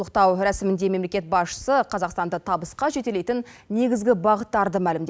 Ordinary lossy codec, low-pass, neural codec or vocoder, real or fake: none; none; none; real